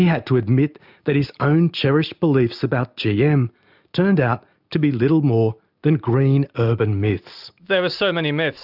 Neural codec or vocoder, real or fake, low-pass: none; real; 5.4 kHz